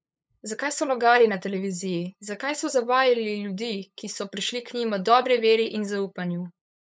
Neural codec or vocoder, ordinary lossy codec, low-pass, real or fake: codec, 16 kHz, 8 kbps, FunCodec, trained on LibriTTS, 25 frames a second; none; none; fake